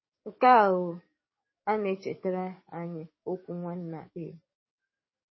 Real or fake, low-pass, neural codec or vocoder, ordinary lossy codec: fake; 7.2 kHz; codec, 44.1 kHz, 7.8 kbps, DAC; MP3, 24 kbps